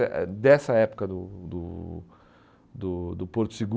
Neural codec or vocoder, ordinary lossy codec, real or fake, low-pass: none; none; real; none